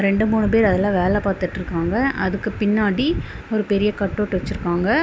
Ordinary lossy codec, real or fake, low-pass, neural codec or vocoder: none; real; none; none